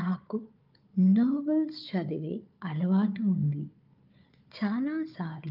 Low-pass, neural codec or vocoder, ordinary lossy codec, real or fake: 5.4 kHz; codec, 16 kHz, 4 kbps, FunCodec, trained on Chinese and English, 50 frames a second; Opus, 24 kbps; fake